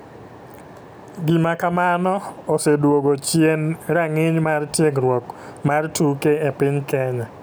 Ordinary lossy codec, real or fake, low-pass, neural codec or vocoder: none; real; none; none